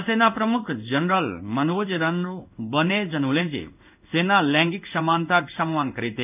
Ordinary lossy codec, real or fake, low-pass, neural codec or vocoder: none; fake; 3.6 kHz; codec, 16 kHz in and 24 kHz out, 1 kbps, XY-Tokenizer